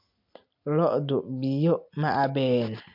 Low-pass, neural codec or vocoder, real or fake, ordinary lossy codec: 5.4 kHz; none; real; MP3, 48 kbps